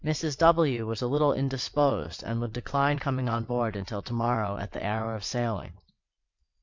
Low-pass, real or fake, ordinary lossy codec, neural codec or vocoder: 7.2 kHz; fake; MP3, 64 kbps; vocoder, 22.05 kHz, 80 mel bands, WaveNeXt